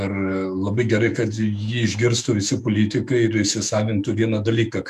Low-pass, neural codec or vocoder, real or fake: 14.4 kHz; autoencoder, 48 kHz, 128 numbers a frame, DAC-VAE, trained on Japanese speech; fake